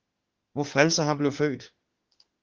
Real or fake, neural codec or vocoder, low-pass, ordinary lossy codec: fake; autoencoder, 48 kHz, 32 numbers a frame, DAC-VAE, trained on Japanese speech; 7.2 kHz; Opus, 16 kbps